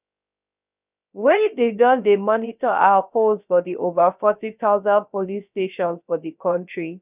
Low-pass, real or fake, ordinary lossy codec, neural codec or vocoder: 3.6 kHz; fake; none; codec, 16 kHz, 0.3 kbps, FocalCodec